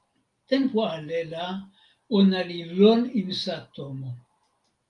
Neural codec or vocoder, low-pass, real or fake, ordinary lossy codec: none; 10.8 kHz; real; Opus, 32 kbps